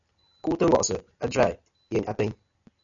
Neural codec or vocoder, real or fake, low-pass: none; real; 7.2 kHz